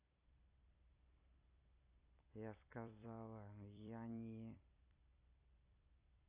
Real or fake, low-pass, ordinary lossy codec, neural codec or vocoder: fake; 3.6 kHz; AAC, 32 kbps; vocoder, 44.1 kHz, 128 mel bands every 256 samples, BigVGAN v2